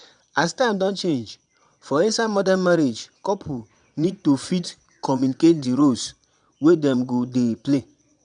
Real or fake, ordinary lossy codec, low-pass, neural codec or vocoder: fake; none; 9.9 kHz; vocoder, 22.05 kHz, 80 mel bands, Vocos